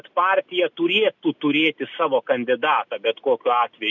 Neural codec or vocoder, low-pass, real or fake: none; 7.2 kHz; real